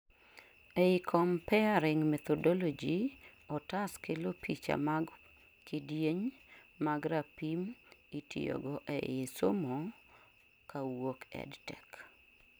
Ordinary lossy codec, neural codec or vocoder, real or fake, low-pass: none; none; real; none